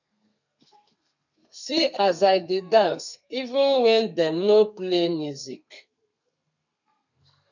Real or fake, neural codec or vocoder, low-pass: fake; codec, 32 kHz, 1.9 kbps, SNAC; 7.2 kHz